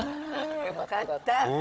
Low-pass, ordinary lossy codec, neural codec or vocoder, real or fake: none; none; codec, 16 kHz, 16 kbps, FunCodec, trained on Chinese and English, 50 frames a second; fake